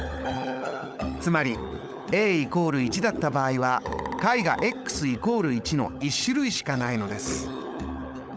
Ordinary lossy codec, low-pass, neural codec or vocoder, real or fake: none; none; codec, 16 kHz, 16 kbps, FunCodec, trained on LibriTTS, 50 frames a second; fake